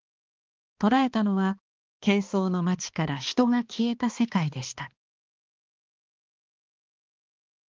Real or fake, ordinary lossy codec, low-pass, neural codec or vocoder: fake; Opus, 32 kbps; 7.2 kHz; codec, 16 kHz, 2 kbps, X-Codec, HuBERT features, trained on balanced general audio